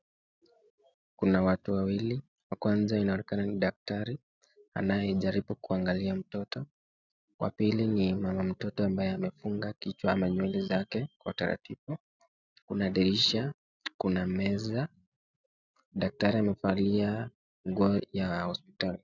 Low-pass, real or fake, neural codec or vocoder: 7.2 kHz; real; none